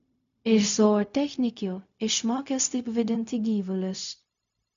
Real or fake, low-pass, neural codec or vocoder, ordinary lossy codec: fake; 7.2 kHz; codec, 16 kHz, 0.4 kbps, LongCat-Audio-Codec; MP3, 64 kbps